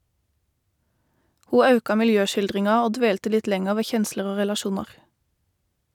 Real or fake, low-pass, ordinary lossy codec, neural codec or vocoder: real; 19.8 kHz; none; none